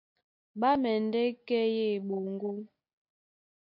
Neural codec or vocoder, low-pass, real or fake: none; 5.4 kHz; real